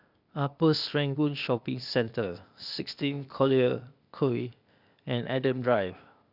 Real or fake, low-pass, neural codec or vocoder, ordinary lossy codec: fake; 5.4 kHz; codec, 16 kHz, 0.8 kbps, ZipCodec; none